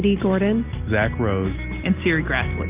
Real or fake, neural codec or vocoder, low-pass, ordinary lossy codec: real; none; 3.6 kHz; Opus, 32 kbps